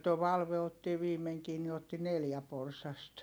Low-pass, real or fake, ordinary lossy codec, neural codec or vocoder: none; real; none; none